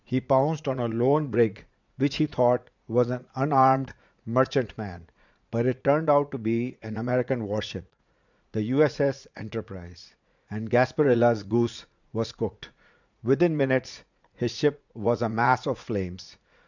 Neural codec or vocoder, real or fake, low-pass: vocoder, 44.1 kHz, 80 mel bands, Vocos; fake; 7.2 kHz